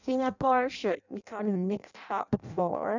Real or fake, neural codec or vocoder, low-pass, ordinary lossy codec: fake; codec, 16 kHz in and 24 kHz out, 0.6 kbps, FireRedTTS-2 codec; 7.2 kHz; none